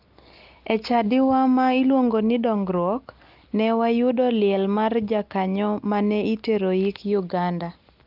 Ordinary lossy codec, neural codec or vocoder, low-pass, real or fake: Opus, 32 kbps; none; 5.4 kHz; real